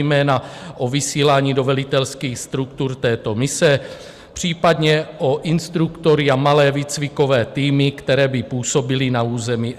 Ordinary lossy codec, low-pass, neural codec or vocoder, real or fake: Opus, 64 kbps; 14.4 kHz; none; real